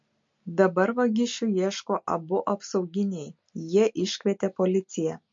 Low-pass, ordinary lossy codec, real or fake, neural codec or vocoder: 7.2 kHz; MP3, 48 kbps; real; none